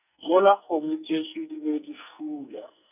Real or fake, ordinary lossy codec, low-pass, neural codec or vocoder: fake; none; 3.6 kHz; codec, 44.1 kHz, 2.6 kbps, SNAC